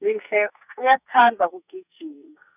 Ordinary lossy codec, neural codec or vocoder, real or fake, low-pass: none; codec, 44.1 kHz, 2.6 kbps, SNAC; fake; 3.6 kHz